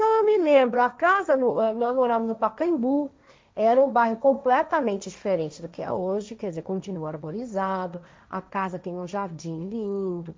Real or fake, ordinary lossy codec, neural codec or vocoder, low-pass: fake; none; codec, 16 kHz, 1.1 kbps, Voila-Tokenizer; none